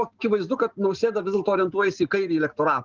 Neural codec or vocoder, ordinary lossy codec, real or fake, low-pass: none; Opus, 16 kbps; real; 7.2 kHz